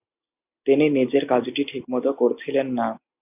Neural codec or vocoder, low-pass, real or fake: none; 3.6 kHz; real